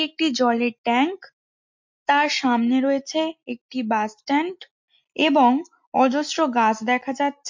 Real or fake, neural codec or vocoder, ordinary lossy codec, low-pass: real; none; MP3, 48 kbps; 7.2 kHz